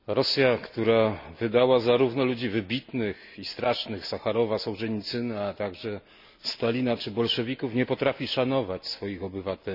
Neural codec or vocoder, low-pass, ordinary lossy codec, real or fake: none; 5.4 kHz; none; real